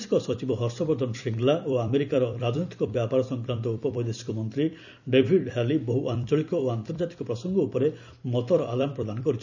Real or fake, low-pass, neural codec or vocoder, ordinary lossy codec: fake; 7.2 kHz; vocoder, 44.1 kHz, 128 mel bands every 256 samples, BigVGAN v2; none